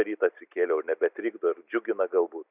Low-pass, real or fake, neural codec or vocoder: 3.6 kHz; real; none